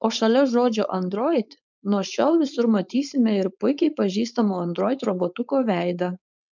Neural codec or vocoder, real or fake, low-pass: codec, 16 kHz, 4.8 kbps, FACodec; fake; 7.2 kHz